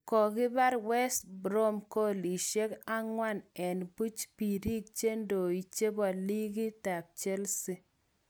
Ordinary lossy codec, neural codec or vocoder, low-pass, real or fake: none; none; none; real